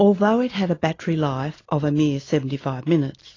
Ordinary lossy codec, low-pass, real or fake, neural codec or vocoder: AAC, 32 kbps; 7.2 kHz; real; none